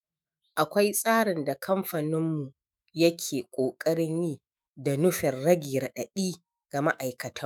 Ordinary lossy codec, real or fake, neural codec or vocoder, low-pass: none; fake; autoencoder, 48 kHz, 128 numbers a frame, DAC-VAE, trained on Japanese speech; none